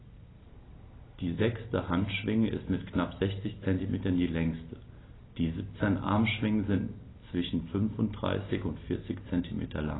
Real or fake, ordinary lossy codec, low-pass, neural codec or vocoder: real; AAC, 16 kbps; 7.2 kHz; none